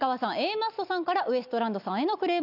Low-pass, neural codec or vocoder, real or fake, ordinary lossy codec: 5.4 kHz; none; real; none